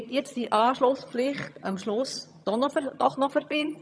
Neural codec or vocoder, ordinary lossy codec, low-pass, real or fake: vocoder, 22.05 kHz, 80 mel bands, HiFi-GAN; none; none; fake